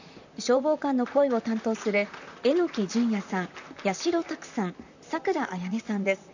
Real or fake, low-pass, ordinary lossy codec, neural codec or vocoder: fake; 7.2 kHz; none; vocoder, 44.1 kHz, 128 mel bands, Pupu-Vocoder